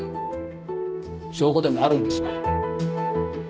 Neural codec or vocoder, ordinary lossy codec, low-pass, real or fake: codec, 16 kHz, 1 kbps, X-Codec, HuBERT features, trained on balanced general audio; none; none; fake